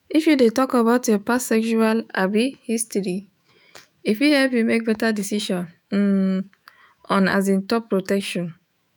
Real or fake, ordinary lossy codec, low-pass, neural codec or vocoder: fake; none; none; autoencoder, 48 kHz, 128 numbers a frame, DAC-VAE, trained on Japanese speech